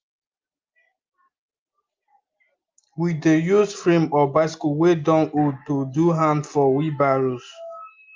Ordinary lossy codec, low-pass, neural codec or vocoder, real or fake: Opus, 24 kbps; 7.2 kHz; none; real